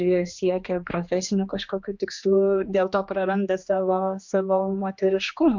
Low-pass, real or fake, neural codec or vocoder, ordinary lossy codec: 7.2 kHz; fake; codec, 16 kHz, 2 kbps, X-Codec, HuBERT features, trained on general audio; MP3, 64 kbps